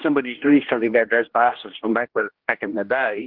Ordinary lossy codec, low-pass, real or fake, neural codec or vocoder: Opus, 16 kbps; 5.4 kHz; fake; codec, 16 kHz, 1 kbps, X-Codec, HuBERT features, trained on general audio